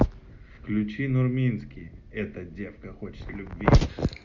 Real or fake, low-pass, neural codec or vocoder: real; 7.2 kHz; none